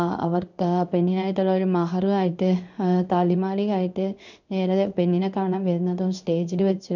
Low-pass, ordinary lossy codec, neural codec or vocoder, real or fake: 7.2 kHz; none; codec, 16 kHz in and 24 kHz out, 1 kbps, XY-Tokenizer; fake